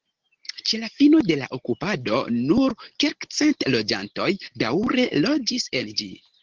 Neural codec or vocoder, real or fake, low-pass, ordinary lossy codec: none; real; 7.2 kHz; Opus, 16 kbps